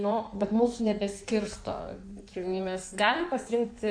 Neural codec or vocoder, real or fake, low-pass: codec, 16 kHz in and 24 kHz out, 1.1 kbps, FireRedTTS-2 codec; fake; 9.9 kHz